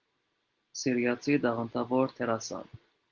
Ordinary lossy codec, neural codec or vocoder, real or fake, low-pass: Opus, 16 kbps; none; real; 7.2 kHz